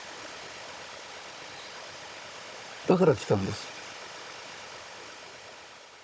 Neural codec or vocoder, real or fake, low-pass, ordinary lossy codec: codec, 16 kHz, 16 kbps, FunCodec, trained on Chinese and English, 50 frames a second; fake; none; none